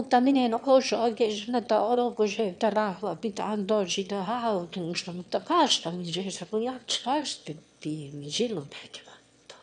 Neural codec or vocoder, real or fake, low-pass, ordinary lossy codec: autoencoder, 22.05 kHz, a latent of 192 numbers a frame, VITS, trained on one speaker; fake; 9.9 kHz; none